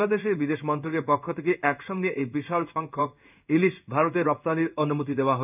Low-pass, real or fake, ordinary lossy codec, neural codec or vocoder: 3.6 kHz; fake; none; codec, 16 kHz in and 24 kHz out, 1 kbps, XY-Tokenizer